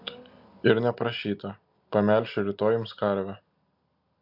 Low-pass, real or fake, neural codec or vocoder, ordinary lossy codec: 5.4 kHz; real; none; MP3, 48 kbps